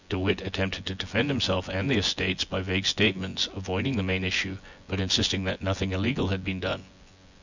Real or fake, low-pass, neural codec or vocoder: fake; 7.2 kHz; vocoder, 24 kHz, 100 mel bands, Vocos